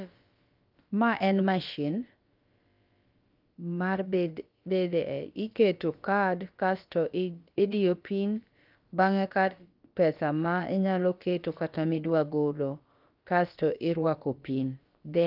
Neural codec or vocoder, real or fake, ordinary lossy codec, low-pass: codec, 16 kHz, about 1 kbps, DyCAST, with the encoder's durations; fake; Opus, 24 kbps; 5.4 kHz